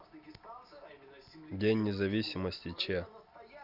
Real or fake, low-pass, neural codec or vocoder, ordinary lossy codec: real; 5.4 kHz; none; Opus, 64 kbps